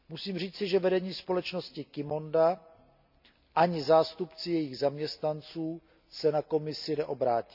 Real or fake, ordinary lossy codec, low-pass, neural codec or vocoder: real; none; 5.4 kHz; none